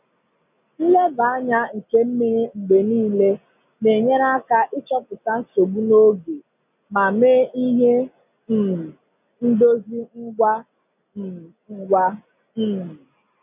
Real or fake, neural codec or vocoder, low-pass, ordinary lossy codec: real; none; 3.6 kHz; none